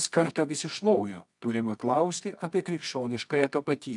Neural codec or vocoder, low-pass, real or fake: codec, 24 kHz, 0.9 kbps, WavTokenizer, medium music audio release; 10.8 kHz; fake